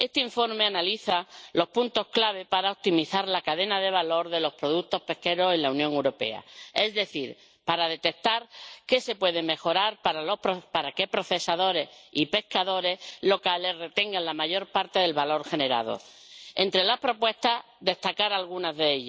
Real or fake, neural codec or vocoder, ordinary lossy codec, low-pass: real; none; none; none